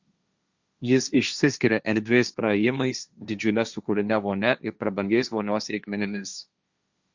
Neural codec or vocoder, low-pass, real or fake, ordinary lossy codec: codec, 16 kHz, 1.1 kbps, Voila-Tokenizer; 7.2 kHz; fake; Opus, 64 kbps